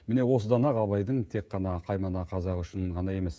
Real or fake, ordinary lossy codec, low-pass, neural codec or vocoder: fake; none; none; codec, 16 kHz, 16 kbps, FreqCodec, smaller model